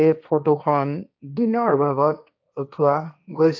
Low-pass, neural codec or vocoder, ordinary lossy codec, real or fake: none; codec, 16 kHz, 1.1 kbps, Voila-Tokenizer; none; fake